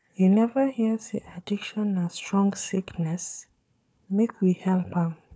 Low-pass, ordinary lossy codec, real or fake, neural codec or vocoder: none; none; fake; codec, 16 kHz, 4 kbps, FunCodec, trained on Chinese and English, 50 frames a second